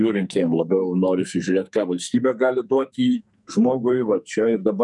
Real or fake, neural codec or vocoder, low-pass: fake; codec, 44.1 kHz, 2.6 kbps, SNAC; 10.8 kHz